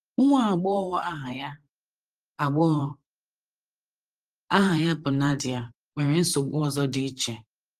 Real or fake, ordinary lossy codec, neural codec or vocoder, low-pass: fake; Opus, 24 kbps; vocoder, 44.1 kHz, 128 mel bands every 512 samples, BigVGAN v2; 14.4 kHz